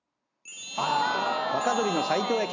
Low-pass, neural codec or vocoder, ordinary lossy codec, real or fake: 7.2 kHz; none; MP3, 64 kbps; real